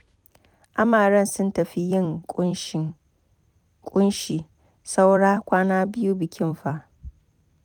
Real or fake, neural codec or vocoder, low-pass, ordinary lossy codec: fake; vocoder, 44.1 kHz, 128 mel bands every 256 samples, BigVGAN v2; 19.8 kHz; none